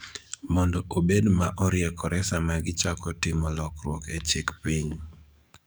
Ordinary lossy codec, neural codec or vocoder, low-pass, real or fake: none; codec, 44.1 kHz, 7.8 kbps, DAC; none; fake